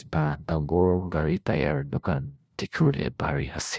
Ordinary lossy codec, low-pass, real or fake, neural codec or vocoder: none; none; fake; codec, 16 kHz, 0.5 kbps, FunCodec, trained on LibriTTS, 25 frames a second